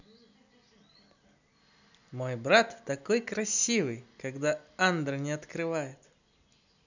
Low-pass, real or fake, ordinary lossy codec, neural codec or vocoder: 7.2 kHz; real; none; none